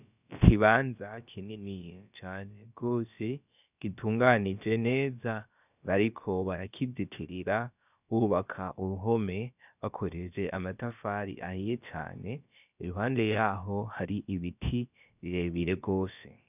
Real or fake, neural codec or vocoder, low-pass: fake; codec, 16 kHz, about 1 kbps, DyCAST, with the encoder's durations; 3.6 kHz